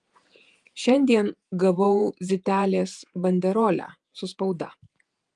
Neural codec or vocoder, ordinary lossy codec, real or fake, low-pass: vocoder, 48 kHz, 128 mel bands, Vocos; Opus, 32 kbps; fake; 10.8 kHz